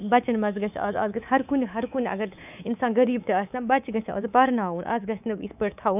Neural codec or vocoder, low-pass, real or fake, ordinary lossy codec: codec, 24 kHz, 3.1 kbps, DualCodec; 3.6 kHz; fake; none